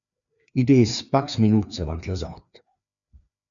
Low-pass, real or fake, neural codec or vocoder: 7.2 kHz; fake; codec, 16 kHz, 2 kbps, FreqCodec, larger model